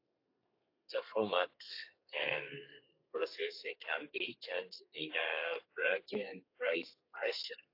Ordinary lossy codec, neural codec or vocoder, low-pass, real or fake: AAC, 32 kbps; codec, 32 kHz, 1.9 kbps, SNAC; 5.4 kHz; fake